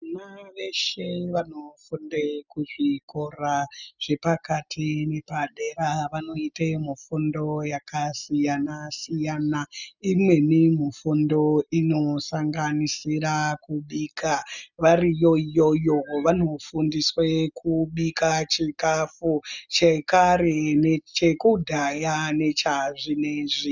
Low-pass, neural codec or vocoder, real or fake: 7.2 kHz; none; real